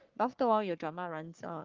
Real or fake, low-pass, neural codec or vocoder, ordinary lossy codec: fake; 7.2 kHz; codec, 44.1 kHz, 3.4 kbps, Pupu-Codec; Opus, 32 kbps